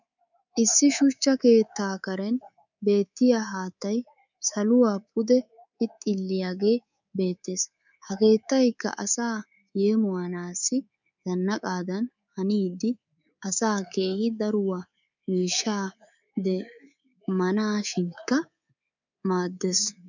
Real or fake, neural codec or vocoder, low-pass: fake; codec, 24 kHz, 3.1 kbps, DualCodec; 7.2 kHz